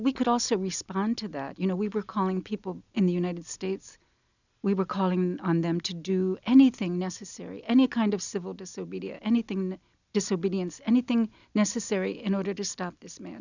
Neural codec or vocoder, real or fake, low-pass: none; real; 7.2 kHz